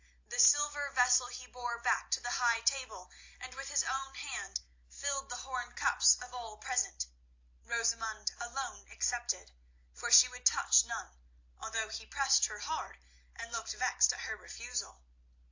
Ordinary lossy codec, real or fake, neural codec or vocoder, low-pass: AAC, 48 kbps; real; none; 7.2 kHz